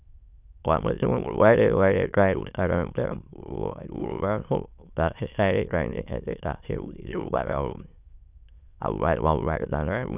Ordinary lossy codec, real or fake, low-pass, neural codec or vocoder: none; fake; 3.6 kHz; autoencoder, 22.05 kHz, a latent of 192 numbers a frame, VITS, trained on many speakers